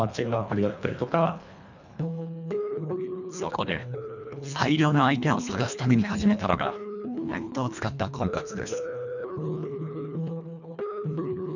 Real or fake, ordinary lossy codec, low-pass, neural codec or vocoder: fake; none; 7.2 kHz; codec, 24 kHz, 1.5 kbps, HILCodec